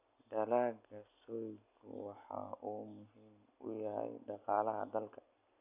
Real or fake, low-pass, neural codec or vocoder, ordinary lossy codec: real; 3.6 kHz; none; none